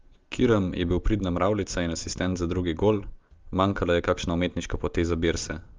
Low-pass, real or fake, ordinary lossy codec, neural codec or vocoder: 7.2 kHz; real; Opus, 32 kbps; none